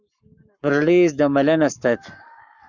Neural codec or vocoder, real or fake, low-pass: codec, 44.1 kHz, 7.8 kbps, Pupu-Codec; fake; 7.2 kHz